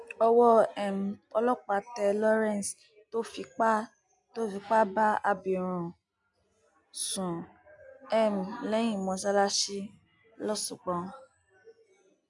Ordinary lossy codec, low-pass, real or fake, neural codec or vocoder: none; 10.8 kHz; real; none